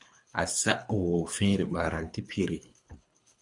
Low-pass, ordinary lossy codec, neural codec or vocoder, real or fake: 10.8 kHz; MP3, 64 kbps; codec, 24 kHz, 3 kbps, HILCodec; fake